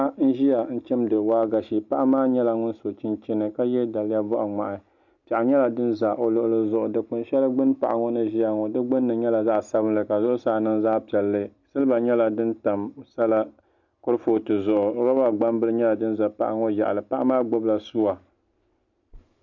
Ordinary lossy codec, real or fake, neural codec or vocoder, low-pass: MP3, 48 kbps; real; none; 7.2 kHz